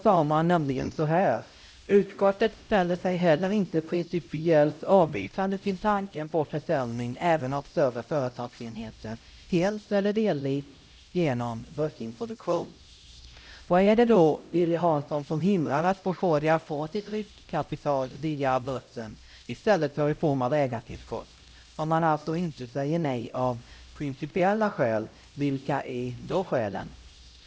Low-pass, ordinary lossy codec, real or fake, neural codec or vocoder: none; none; fake; codec, 16 kHz, 0.5 kbps, X-Codec, HuBERT features, trained on LibriSpeech